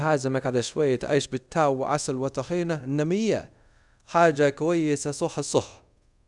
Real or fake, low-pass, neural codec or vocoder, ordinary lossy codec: fake; 10.8 kHz; codec, 24 kHz, 0.5 kbps, DualCodec; none